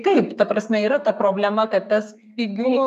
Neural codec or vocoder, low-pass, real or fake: codec, 32 kHz, 1.9 kbps, SNAC; 14.4 kHz; fake